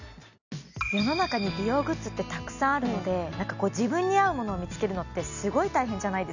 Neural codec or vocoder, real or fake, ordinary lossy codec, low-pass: none; real; none; 7.2 kHz